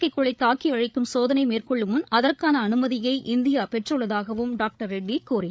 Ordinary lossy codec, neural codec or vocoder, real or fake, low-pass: none; codec, 16 kHz, 8 kbps, FreqCodec, larger model; fake; none